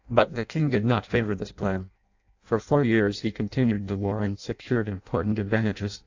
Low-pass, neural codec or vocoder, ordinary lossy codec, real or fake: 7.2 kHz; codec, 16 kHz in and 24 kHz out, 0.6 kbps, FireRedTTS-2 codec; AAC, 48 kbps; fake